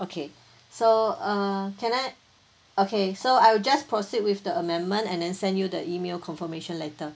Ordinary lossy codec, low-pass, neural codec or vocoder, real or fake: none; none; none; real